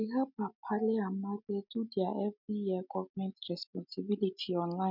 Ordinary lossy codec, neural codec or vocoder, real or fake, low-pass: none; none; real; 5.4 kHz